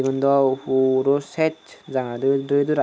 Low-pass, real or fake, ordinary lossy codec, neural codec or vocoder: none; real; none; none